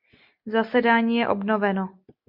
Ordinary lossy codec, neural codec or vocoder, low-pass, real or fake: MP3, 48 kbps; none; 5.4 kHz; real